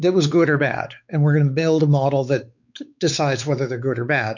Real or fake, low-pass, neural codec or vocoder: fake; 7.2 kHz; codec, 16 kHz, 4 kbps, X-Codec, WavLM features, trained on Multilingual LibriSpeech